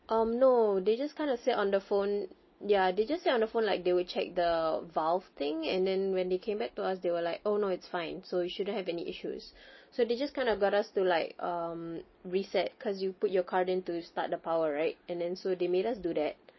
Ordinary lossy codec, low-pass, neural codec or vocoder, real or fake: MP3, 24 kbps; 7.2 kHz; none; real